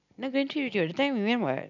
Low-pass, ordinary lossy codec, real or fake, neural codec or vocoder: 7.2 kHz; none; real; none